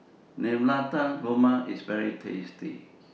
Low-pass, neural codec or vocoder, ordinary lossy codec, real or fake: none; none; none; real